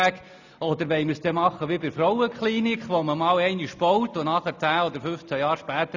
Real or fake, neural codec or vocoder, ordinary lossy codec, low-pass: real; none; none; 7.2 kHz